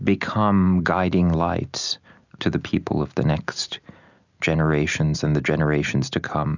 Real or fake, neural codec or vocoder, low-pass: real; none; 7.2 kHz